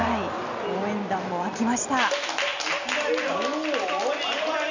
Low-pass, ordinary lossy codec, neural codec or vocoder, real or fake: 7.2 kHz; none; none; real